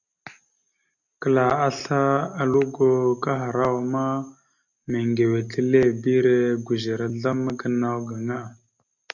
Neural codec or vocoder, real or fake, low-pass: none; real; 7.2 kHz